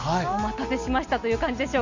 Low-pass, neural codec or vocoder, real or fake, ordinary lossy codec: 7.2 kHz; none; real; none